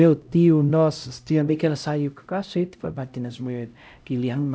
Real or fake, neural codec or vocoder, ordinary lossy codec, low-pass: fake; codec, 16 kHz, 0.5 kbps, X-Codec, HuBERT features, trained on LibriSpeech; none; none